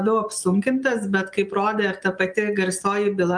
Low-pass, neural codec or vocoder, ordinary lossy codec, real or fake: 9.9 kHz; none; Opus, 32 kbps; real